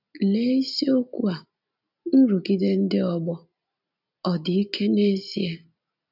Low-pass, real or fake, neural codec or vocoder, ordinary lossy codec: 5.4 kHz; real; none; none